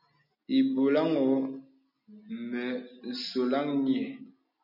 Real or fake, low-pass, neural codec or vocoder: real; 5.4 kHz; none